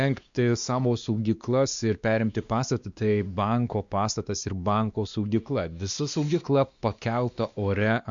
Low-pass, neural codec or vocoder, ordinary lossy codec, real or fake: 7.2 kHz; codec, 16 kHz, 2 kbps, X-Codec, WavLM features, trained on Multilingual LibriSpeech; Opus, 64 kbps; fake